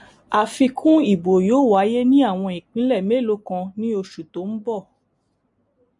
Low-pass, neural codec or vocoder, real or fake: 10.8 kHz; none; real